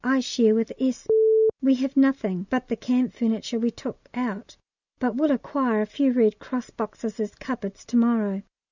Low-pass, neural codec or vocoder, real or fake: 7.2 kHz; none; real